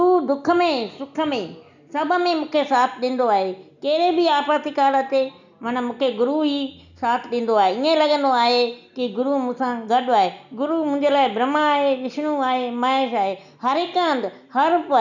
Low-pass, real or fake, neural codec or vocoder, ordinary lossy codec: 7.2 kHz; real; none; none